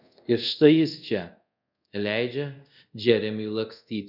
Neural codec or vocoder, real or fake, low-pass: codec, 24 kHz, 0.5 kbps, DualCodec; fake; 5.4 kHz